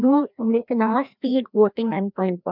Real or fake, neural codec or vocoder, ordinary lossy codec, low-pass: fake; codec, 16 kHz, 1 kbps, FreqCodec, larger model; none; 5.4 kHz